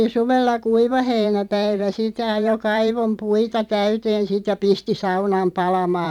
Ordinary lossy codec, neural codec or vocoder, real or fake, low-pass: none; vocoder, 44.1 kHz, 128 mel bands every 512 samples, BigVGAN v2; fake; 19.8 kHz